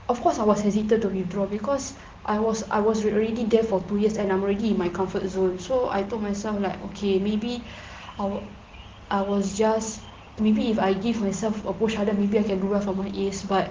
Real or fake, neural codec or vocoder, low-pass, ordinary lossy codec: real; none; 7.2 kHz; Opus, 16 kbps